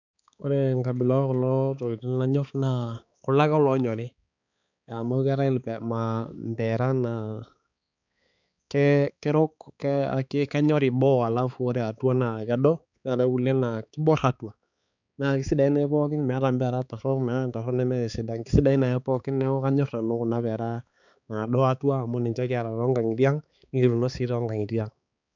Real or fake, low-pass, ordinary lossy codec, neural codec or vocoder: fake; 7.2 kHz; none; codec, 16 kHz, 4 kbps, X-Codec, HuBERT features, trained on balanced general audio